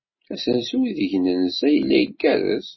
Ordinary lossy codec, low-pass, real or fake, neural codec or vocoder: MP3, 24 kbps; 7.2 kHz; real; none